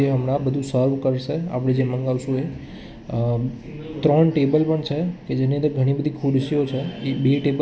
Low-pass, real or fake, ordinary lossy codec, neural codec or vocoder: none; real; none; none